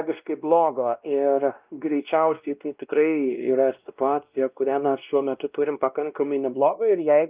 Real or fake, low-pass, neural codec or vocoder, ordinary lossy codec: fake; 3.6 kHz; codec, 16 kHz, 1 kbps, X-Codec, WavLM features, trained on Multilingual LibriSpeech; Opus, 24 kbps